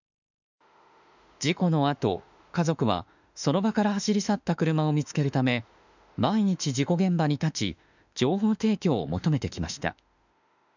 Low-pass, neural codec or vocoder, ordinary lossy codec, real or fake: 7.2 kHz; autoencoder, 48 kHz, 32 numbers a frame, DAC-VAE, trained on Japanese speech; none; fake